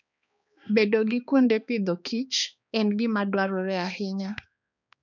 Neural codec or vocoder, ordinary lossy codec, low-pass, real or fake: codec, 16 kHz, 2 kbps, X-Codec, HuBERT features, trained on balanced general audio; none; 7.2 kHz; fake